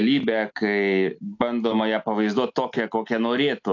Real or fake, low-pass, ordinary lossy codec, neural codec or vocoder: real; 7.2 kHz; AAC, 48 kbps; none